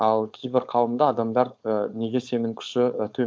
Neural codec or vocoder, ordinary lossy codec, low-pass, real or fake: codec, 16 kHz, 4.8 kbps, FACodec; none; none; fake